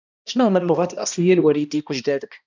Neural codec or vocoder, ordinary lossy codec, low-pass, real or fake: codec, 16 kHz, 1 kbps, X-Codec, HuBERT features, trained on balanced general audio; none; 7.2 kHz; fake